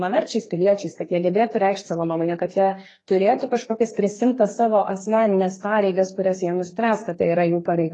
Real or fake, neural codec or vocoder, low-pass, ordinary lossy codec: fake; codec, 32 kHz, 1.9 kbps, SNAC; 10.8 kHz; AAC, 32 kbps